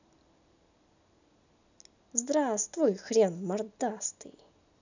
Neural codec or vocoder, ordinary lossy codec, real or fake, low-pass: none; none; real; 7.2 kHz